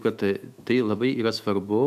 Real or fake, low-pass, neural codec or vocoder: fake; 14.4 kHz; autoencoder, 48 kHz, 128 numbers a frame, DAC-VAE, trained on Japanese speech